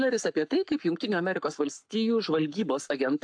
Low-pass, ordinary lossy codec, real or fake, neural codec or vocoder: 9.9 kHz; AAC, 64 kbps; fake; codec, 44.1 kHz, 7.8 kbps, Pupu-Codec